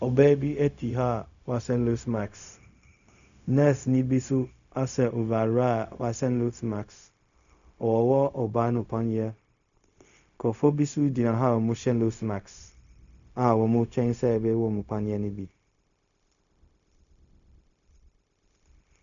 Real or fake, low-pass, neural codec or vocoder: fake; 7.2 kHz; codec, 16 kHz, 0.4 kbps, LongCat-Audio-Codec